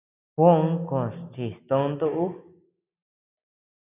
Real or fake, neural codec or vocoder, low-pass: real; none; 3.6 kHz